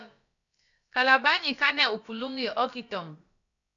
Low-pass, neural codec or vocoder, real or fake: 7.2 kHz; codec, 16 kHz, about 1 kbps, DyCAST, with the encoder's durations; fake